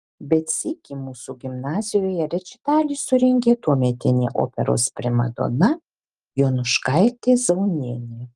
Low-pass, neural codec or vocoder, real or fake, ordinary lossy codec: 10.8 kHz; none; real; Opus, 24 kbps